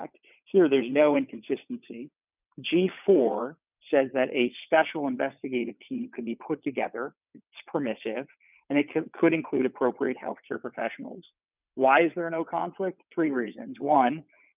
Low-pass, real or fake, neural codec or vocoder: 3.6 kHz; fake; vocoder, 22.05 kHz, 80 mel bands, WaveNeXt